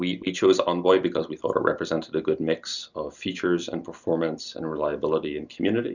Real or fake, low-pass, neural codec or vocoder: real; 7.2 kHz; none